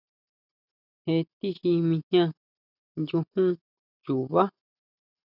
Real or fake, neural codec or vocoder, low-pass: real; none; 5.4 kHz